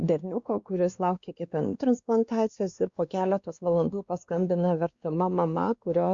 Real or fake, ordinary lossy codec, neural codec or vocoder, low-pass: fake; Opus, 64 kbps; codec, 16 kHz, 2 kbps, X-Codec, WavLM features, trained on Multilingual LibriSpeech; 7.2 kHz